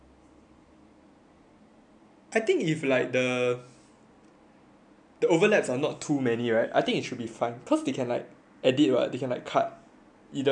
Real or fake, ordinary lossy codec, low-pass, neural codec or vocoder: real; none; 9.9 kHz; none